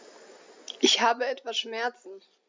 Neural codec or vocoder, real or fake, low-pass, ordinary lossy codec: none; real; 7.2 kHz; MP3, 64 kbps